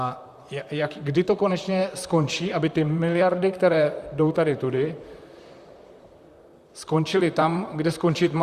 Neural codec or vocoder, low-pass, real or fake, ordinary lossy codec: vocoder, 44.1 kHz, 128 mel bands, Pupu-Vocoder; 14.4 kHz; fake; Opus, 64 kbps